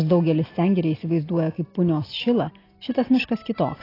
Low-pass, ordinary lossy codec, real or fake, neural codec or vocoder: 5.4 kHz; AAC, 24 kbps; real; none